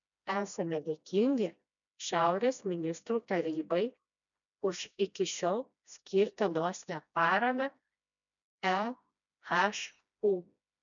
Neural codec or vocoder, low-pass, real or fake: codec, 16 kHz, 1 kbps, FreqCodec, smaller model; 7.2 kHz; fake